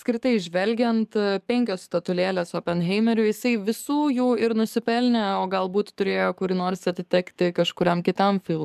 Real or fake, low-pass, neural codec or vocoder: fake; 14.4 kHz; codec, 44.1 kHz, 7.8 kbps, DAC